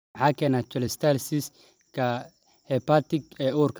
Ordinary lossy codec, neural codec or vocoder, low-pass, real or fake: none; vocoder, 44.1 kHz, 128 mel bands every 256 samples, BigVGAN v2; none; fake